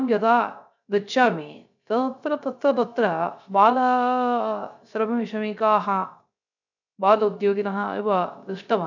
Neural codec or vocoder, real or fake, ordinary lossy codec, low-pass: codec, 16 kHz, 0.3 kbps, FocalCodec; fake; none; 7.2 kHz